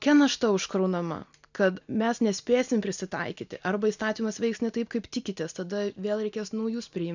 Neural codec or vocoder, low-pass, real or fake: none; 7.2 kHz; real